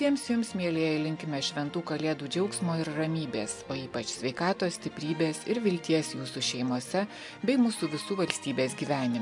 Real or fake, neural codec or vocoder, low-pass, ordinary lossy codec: real; none; 10.8 kHz; MP3, 64 kbps